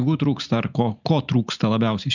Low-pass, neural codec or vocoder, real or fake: 7.2 kHz; none; real